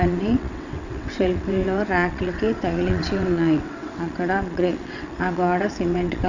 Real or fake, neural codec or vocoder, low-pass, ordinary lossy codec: fake; vocoder, 22.05 kHz, 80 mel bands, WaveNeXt; 7.2 kHz; none